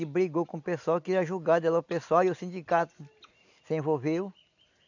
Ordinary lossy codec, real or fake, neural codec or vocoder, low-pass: none; real; none; 7.2 kHz